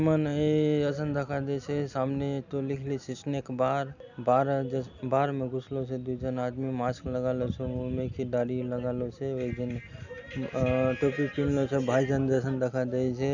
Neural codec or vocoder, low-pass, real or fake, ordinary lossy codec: none; 7.2 kHz; real; none